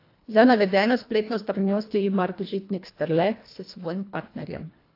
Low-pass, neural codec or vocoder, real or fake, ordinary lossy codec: 5.4 kHz; codec, 24 kHz, 1.5 kbps, HILCodec; fake; AAC, 32 kbps